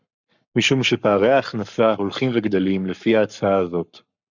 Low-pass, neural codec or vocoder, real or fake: 7.2 kHz; codec, 44.1 kHz, 7.8 kbps, Pupu-Codec; fake